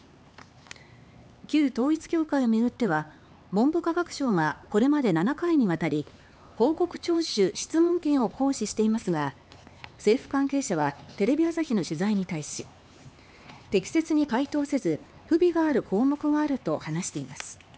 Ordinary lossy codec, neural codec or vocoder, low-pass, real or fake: none; codec, 16 kHz, 4 kbps, X-Codec, HuBERT features, trained on LibriSpeech; none; fake